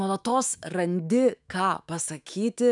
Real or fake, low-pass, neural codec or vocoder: fake; 10.8 kHz; autoencoder, 48 kHz, 128 numbers a frame, DAC-VAE, trained on Japanese speech